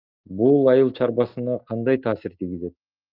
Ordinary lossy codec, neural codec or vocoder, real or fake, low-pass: Opus, 24 kbps; none; real; 5.4 kHz